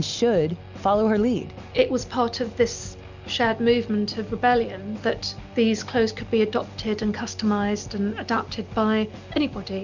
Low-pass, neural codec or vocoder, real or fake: 7.2 kHz; none; real